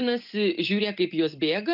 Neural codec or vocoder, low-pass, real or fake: none; 5.4 kHz; real